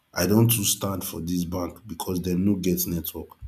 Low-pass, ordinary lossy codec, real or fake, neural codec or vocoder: 14.4 kHz; none; real; none